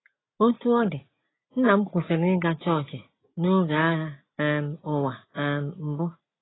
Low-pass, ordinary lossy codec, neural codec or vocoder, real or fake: 7.2 kHz; AAC, 16 kbps; none; real